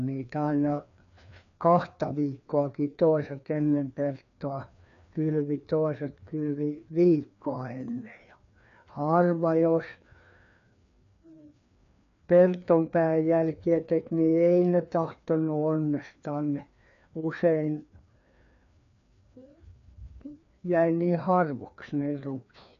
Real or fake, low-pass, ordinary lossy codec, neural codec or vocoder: fake; 7.2 kHz; none; codec, 16 kHz, 2 kbps, FreqCodec, larger model